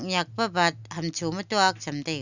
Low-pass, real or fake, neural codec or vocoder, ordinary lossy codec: 7.2 kHz; real; none; none